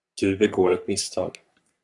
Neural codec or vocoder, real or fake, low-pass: codec, 44.1 kHz, 3.4 kbps, Pupu-Codec; fake; 10.8 kHz